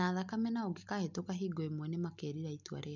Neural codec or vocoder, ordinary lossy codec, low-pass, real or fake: none; none; 7.2 kHz; real